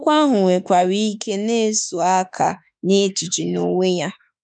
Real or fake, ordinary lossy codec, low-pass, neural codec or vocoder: fake; none; 9.9 kHz; autoencoder, 48 kHz, 32 numbers a frame, DAC-VAE, trained on Japanese speech